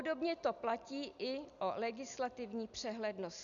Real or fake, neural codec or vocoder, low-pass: real; none; 7.2 kHz